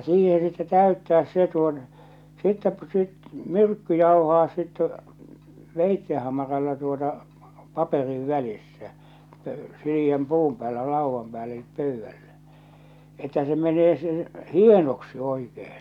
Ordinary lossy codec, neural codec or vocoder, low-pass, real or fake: none; none; 19.8 kHz; real